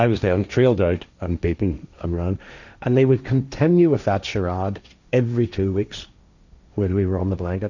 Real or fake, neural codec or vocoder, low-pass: fake; codec, 16 kHz, 1.1 kbps, Voila-Tokenizer; 7.2 kHz